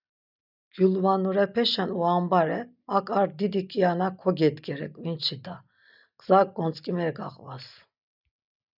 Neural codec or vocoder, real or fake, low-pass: none; real; 5.4 kHz